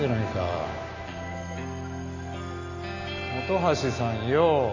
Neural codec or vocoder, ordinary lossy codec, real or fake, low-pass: none; none; real; 7.2 kHz